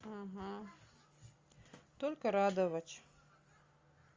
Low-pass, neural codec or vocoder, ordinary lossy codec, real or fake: 7.2 kHz; none; Opus, 32 kbps; real